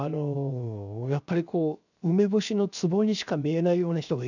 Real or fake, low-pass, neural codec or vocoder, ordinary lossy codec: fake; 7.2 kHz; codec, 16 kHz, 0.7 kbps, FocalCodec; none